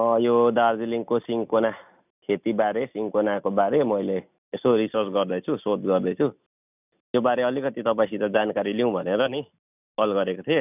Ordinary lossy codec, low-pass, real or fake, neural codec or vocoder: none; 3.6 kHz; real; none